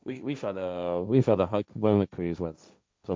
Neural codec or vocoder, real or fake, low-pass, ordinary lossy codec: codec, 16 kHz, 1.1 kbps, Voila-Tokenizer; fake; none; none